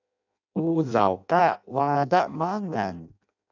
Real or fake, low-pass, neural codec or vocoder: fake; 7.2 kHz; codec, 16 kHz in and 24 kHz out, 0.6 kbps, FireRedTTS-2 codec